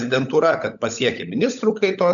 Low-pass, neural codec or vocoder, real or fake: 7.2 kHz; codec, 16 kHz, 16 kbps, FunCodec, trained on LibriTTS, 50 frames a second; fake